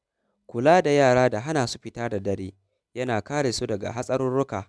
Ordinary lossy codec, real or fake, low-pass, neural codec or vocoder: none; real; none; none